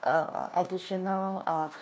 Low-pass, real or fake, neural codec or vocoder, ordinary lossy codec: none; fake; codec, 16 kHz, 1 kbps, FunCodec, trained on LibriTTS, 50 frames a second; none